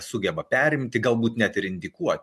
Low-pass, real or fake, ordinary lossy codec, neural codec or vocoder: 14.4 kHz; real; MP3, 64 kbps; none